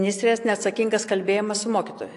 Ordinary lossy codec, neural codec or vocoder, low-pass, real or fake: AAC, 48 kbps; none; 10.8 kHz; real